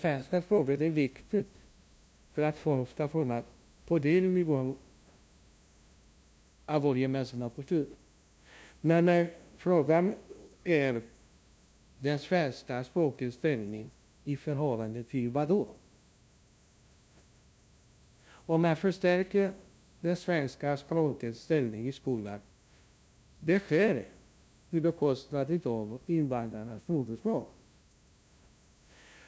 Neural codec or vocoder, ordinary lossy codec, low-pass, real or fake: codec, 16 kHz, 0.5 kbps, FunCodec, trained on LibriTTS, 25 frames a second; none; none; fake